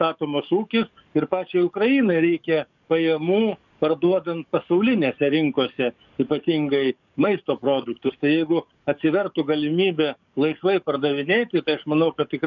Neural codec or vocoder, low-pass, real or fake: codec, 44.1 kHz, 7.8 kbps, DAC; 7.2 kHz; fake